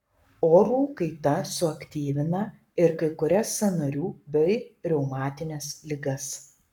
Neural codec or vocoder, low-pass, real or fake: codec, 44.1 kHz, 7.8 kbps, Pupu-Codec; 19.8 kHz; fake